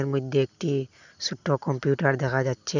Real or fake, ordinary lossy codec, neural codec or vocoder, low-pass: real; none; none; 7.2 kHz